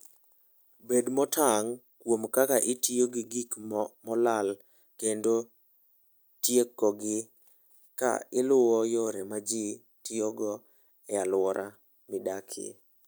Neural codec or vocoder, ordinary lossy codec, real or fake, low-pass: none; none; real; none